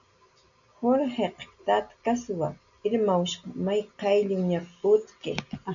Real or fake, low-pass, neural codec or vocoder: real; 7.2 kHz; none